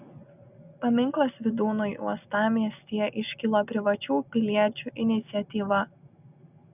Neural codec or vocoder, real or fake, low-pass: none; real; 3.6 kHz